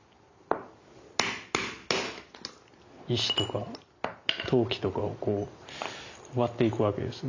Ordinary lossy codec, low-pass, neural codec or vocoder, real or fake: AAC, 48 kbps; 7.2 kHz; none; real